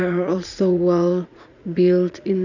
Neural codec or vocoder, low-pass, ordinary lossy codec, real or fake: vocoder, 44.1 kHz, 80 mel bands, Vocos; 7.2 kHz; none; fake